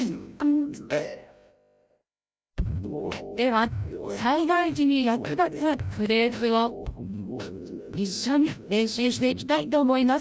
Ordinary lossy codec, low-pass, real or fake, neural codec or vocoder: none; none; fake; codec, 16 kHz, 0.5 kbps, FreqCodec, larger model